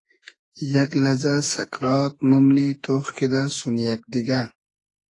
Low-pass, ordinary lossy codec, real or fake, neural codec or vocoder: 10.8 kHz; AAC, 32 kbps; fake; autoencoder, 48 kHz, 32 numbers a frame, DAC-VAE, trained on Japanese speech